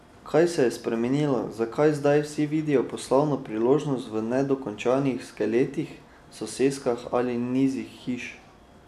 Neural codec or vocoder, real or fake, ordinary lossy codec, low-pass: none; real; none; 14.4 kHz